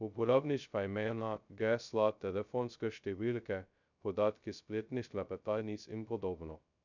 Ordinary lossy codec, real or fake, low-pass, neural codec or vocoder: none; fake; 7.2 kHz; codec, 16 kHz, 0.2 kbps, FocalCodec